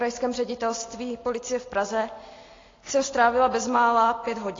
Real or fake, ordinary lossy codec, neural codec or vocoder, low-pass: real; AAC, 32 kbps; none; 7.2 kHz